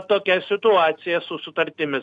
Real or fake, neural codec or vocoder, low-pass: real; none; 10.8 kHz